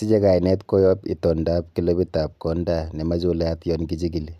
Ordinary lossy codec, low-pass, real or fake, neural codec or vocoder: none; 14.4 kHz; real; none